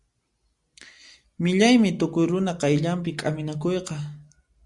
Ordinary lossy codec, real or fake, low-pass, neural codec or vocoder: Opus, 64 kbps; real; 10.8 kHz; none